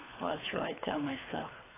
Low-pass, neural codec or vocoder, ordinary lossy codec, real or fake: 3.6 kHz; codec, 24 kHz, 3 kbps, HILCodec; AAC, 16 kbps; fake